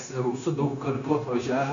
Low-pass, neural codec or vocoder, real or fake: 7.2 kHz; codec, 16 kHz, 0.9 kbps, LongCat-Audio-Codec; fake